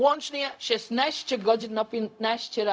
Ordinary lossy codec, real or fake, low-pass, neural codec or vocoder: none; fake; none; codec, 16 kHz, 0.4 kbps, LongCat-Audio-Codec